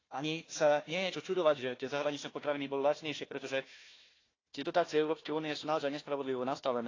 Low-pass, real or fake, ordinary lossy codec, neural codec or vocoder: 7.2 kHz; fake; AAC, 32 kbps; codec, 16 kHz, 1 kbps, FunCodec, trained on Chinese and English, 50 frames a second